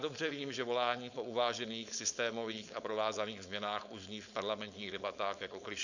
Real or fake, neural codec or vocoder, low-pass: fake; codec, 16 kHz, 4.8 kbps, FACodec; 7.2 kHz